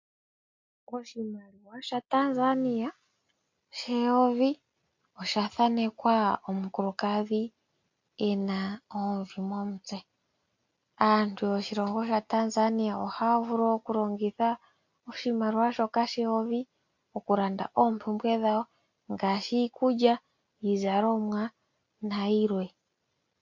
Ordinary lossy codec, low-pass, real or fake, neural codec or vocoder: MP3, 48 kbps; 7.2 kHz; real; none